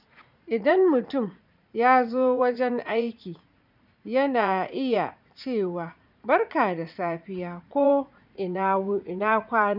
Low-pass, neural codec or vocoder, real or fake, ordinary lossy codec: 5.4 kHz; vocoder, 44.1 kHz, 80 mel bands, Vocos; fake; none